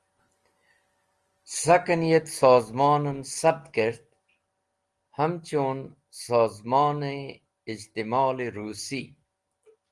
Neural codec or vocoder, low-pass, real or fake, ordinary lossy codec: none; 10.8 kHz; real; Opus, 24 kbps